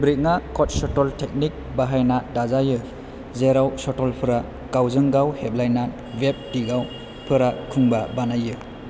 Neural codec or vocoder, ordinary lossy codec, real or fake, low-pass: none; none; real; none